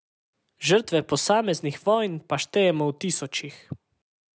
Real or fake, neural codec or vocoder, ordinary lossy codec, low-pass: real; none; none; none